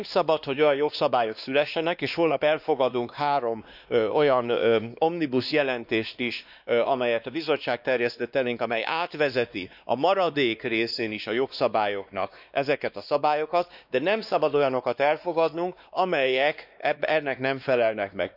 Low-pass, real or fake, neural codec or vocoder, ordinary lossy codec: 5.4 kHz; fake; codec, 16 kHz, 2 kbps, X-Codec, WavLM features, trained on Multilingual LibriSpeech; none